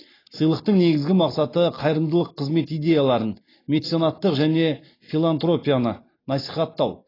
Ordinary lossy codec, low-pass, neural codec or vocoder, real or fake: AAC, 24 kbps; 5.4 kHz; none; real